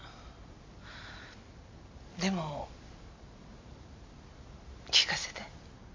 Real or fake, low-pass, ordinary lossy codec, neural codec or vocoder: real; 7.2 kHz; MP3, 48 kbps; none